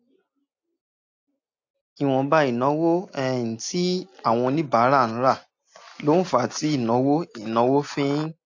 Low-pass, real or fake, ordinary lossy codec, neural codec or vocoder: 7.2 kHz; real; AAC, 48 kbps; none